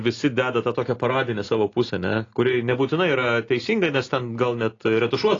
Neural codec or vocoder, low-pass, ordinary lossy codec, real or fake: none; 7.2 kHz; AAC, 32 kbps; real